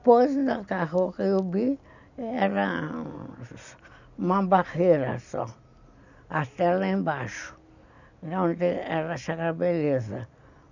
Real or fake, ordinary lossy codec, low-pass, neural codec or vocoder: real; none; 7.2 kHz; none